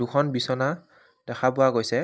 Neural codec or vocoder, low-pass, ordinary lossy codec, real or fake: none; none; none; real